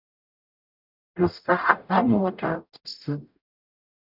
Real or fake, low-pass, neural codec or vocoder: fake; 5.4 kHz; codec, 44.1 kHz, 0.9 kbps, DAC